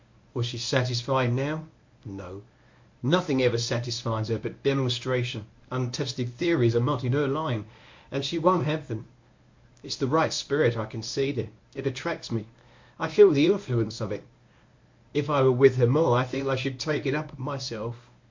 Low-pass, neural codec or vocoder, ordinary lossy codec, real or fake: 7.2 kHz; codec, 24 kHz, 0.9 kbps, WavTokenizer, medium speech release version 1; MP3, 48 kbps; fake